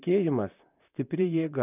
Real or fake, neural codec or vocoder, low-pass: fake; vocoder, 44.1 kHz, 128 mel bands, Pupu-Vocoder; 3.6 kHz